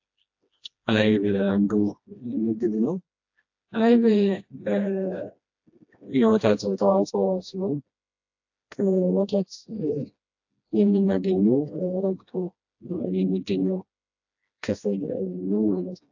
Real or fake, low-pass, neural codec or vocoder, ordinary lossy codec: fake; 7.2 kHz; codec, 16 kHz, 1 kbps, FreqCodec, smaller model; AAC, 48 kbps